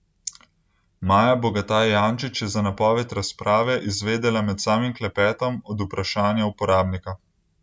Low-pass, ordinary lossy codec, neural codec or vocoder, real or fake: none; none; none; real